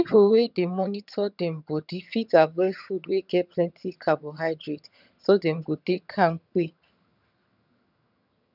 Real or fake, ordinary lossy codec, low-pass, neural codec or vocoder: fake; none; 5.4 kHz; vocoder, 22.05 kHz, 80 mel bands, HiFi-GAN